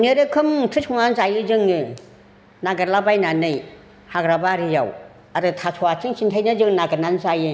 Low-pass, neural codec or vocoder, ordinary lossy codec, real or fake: none; none; none; real